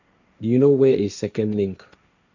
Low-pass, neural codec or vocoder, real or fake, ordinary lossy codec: 7.2 kHz; codec, 16 kHz, 1.1 kbps, Voila-Tokenizer; fake; none